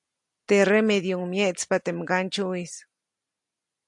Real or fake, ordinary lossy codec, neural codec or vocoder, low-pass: real; AAC, 64 kbps; none; 10.8 kHz